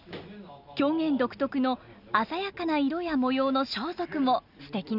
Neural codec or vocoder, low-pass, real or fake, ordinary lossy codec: none; 5.4 kHz; real; none